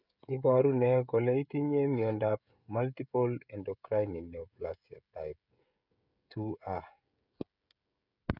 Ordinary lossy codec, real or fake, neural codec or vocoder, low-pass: none; fake; codec, 16 kHz, 16 kbps, FreqCodec, smaller model; 5.4 kHz